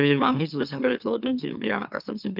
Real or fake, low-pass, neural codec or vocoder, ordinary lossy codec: fake; 5.4 kHz; autoencoder, 44.1 kHz, a latent of 192 numbers a frame, MeloTTS; none